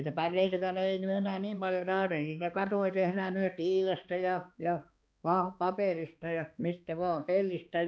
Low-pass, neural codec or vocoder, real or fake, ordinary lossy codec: none; codec, 16 kHz, 2 kbps, X-Codec, HuBERT features, trained on balanced general audio; fake; none